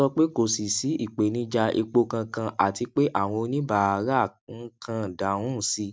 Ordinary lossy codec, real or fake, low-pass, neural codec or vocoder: none; real; none; none